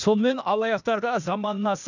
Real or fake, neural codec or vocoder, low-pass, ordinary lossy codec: fake; codec, 16 kHz, 0.8 kbps, ZipCodec; 7.2 kHz; none